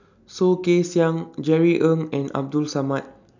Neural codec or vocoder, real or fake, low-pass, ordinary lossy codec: none; real; 7.2 kHz; none